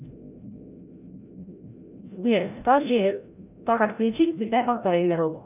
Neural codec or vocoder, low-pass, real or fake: codec, 16 kHz, 0.5 kbps, FreqCodec, larger model; 3.6 kHz; fake